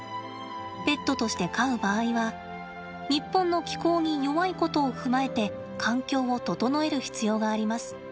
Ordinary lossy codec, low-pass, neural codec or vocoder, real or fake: none; none; none; real